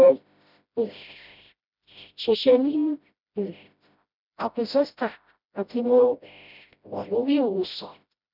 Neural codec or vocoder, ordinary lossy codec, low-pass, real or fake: codec, 16 kHz, 0.5 kbps, FreqCodec, smaller model; none; 5.4 kHz; fake